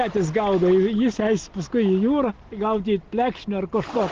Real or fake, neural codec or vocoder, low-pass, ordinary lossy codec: real; none; 7.2 kHz; Opus, 16 kbps